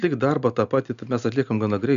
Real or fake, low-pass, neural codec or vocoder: real; 7.2 kHz; none